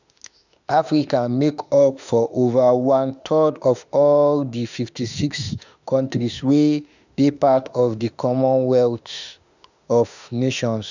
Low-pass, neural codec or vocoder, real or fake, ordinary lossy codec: 7.2 kHz; autoencoder, 48 kHz, 32 numbers a frame, DAC-VAE, trained on Japanese speech; fake; none